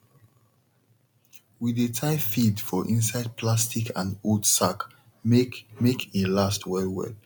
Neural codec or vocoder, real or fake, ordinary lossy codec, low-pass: none; real; none; none